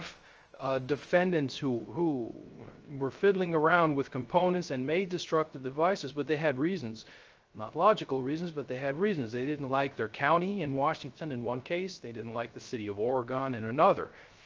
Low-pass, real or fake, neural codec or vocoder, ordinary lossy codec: 7.2 kHz; fake; codec, 16 kHz, 0.3 kbps, FocalCodec; Opus, 24 kbps